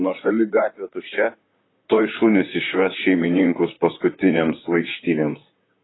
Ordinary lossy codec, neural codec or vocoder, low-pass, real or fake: AAC, 16 kbps; vocoder, 44.1 kHz, 128 mel bands, Pupu-Vocoder; 7.2 kHz; fake